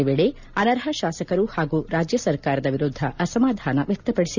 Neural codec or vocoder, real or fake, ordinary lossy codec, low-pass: none; real; none; 7.2 kHz